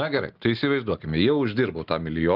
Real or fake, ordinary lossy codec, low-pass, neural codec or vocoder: real; Opus, 32 kbps; 5.4 kHz; none